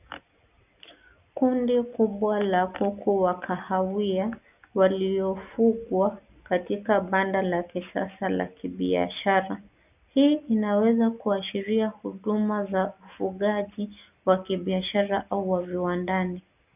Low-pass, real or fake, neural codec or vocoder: 3.6 kHz; real; none